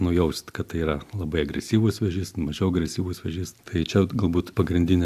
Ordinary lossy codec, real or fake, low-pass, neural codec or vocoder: AAC, 96 kbps; real; 14.4 kHz; none